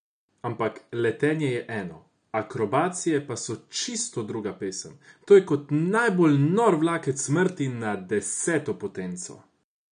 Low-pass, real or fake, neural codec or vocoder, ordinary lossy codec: 9.9 kHz; real; none; none